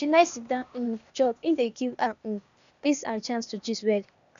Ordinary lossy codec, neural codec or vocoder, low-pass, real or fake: none; codec, 16 kHz, 0.8 kbps, ZipCodec; 7.2 kHz; fake